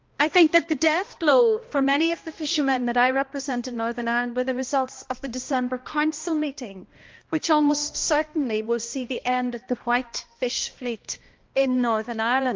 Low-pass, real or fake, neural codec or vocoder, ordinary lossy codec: 7.2 kHz; fake; codec, 16 kHz, 1 kbps, X-Codec, HuBERT features, trained on balanced general audio; Opus, 32 kbps